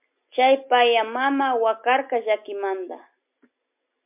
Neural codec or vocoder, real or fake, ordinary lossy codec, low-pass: none; real; MP3, 32 kbps; 3.6 kHz